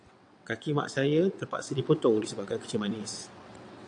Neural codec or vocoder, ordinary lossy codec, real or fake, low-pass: vocoder, 22.05 kHz, 80 mel bands, WaveNeXt; AAC, 64 kbps; fake; 9.9 kHz